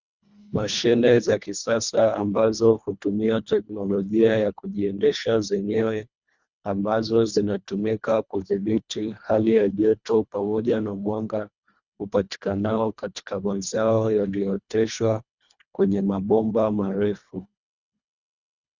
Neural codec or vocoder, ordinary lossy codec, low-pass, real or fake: codec, 24 kHz, 1.5 kbps, HILCodec; Opus, 64 kbps; 7.2 kHz; fake